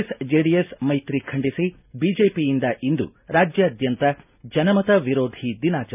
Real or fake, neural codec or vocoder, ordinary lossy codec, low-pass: real; none; none; 3.6 kHz